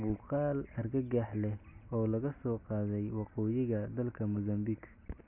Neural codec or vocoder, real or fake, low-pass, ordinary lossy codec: none; real; 3.6 kHz; AAC, 32 kbps